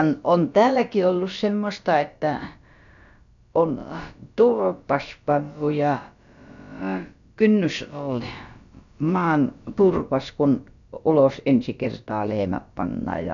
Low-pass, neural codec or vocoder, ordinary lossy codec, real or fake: 7.2 kHz; codec, 16 kHz, about 1 kbps, DyCAST, with the encoder's durations; none; fake